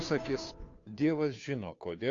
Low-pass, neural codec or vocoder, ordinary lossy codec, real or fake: 7.2 kHz; codec, 16 kHz, 2 kbps, FunCodec, trained on Chinese and English, 25 frames a second; AAC, 48 kbps; fake